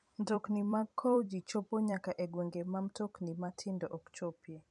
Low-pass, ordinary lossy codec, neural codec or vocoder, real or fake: 10.8 kHz; none; vocoder, 44.1 kHz, 128 mel bands every 256 samples, BigVGAN v2; fake